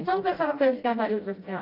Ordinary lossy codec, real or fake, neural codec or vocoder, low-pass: AAC, 32 kbps; fake; codec, 16 kHz, 0.5 kbps, FreqCodec, smaller model; 5.4 kHz